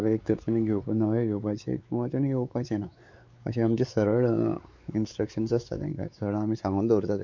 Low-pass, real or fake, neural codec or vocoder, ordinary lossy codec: 7.2 kHz; fake; codec, 16 kHz, 4 kbps, X-Codec, WavLM features, trained on Multilingual LibriSpeech; MP3, 48 kbps